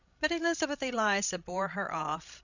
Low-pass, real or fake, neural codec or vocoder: 7.2 kHz; fake; codec, 16 kHz, 16 kbps, FreqCodec, larger model